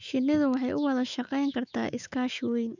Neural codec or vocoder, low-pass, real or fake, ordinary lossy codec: autoencoder, 48 kHz, 128 numbers a frame, DAC-VAE, trained on Japanese speech; 7.2 kHz; fake; none